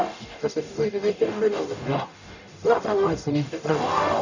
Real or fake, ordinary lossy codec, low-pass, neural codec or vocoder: fake; none; 7.2 kHz; codec, 44.1 kHz, 0.9 kbps, DAC